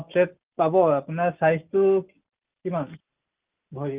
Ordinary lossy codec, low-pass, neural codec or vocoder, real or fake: Opus, 24 kbps; 3.6 kHz; none; real